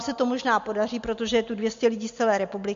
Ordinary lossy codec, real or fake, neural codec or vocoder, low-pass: MP3, 48 kbps; real; none; 7.2 kHz